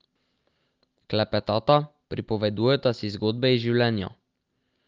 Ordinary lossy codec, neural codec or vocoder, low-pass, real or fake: Opus, 32 kbps; none; 7.2 kHz; real